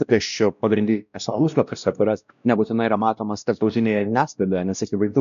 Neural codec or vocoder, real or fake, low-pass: codec, 16 kHz, 1 kbps, X-Codec, WavLM features, trained on Multilingual LibriSpeech; fake; 7.2 kHz